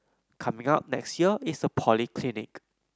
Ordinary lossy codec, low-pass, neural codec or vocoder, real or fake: none; none; none; real